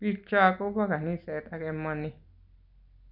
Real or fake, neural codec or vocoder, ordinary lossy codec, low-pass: real; none; none; 5.4 kHz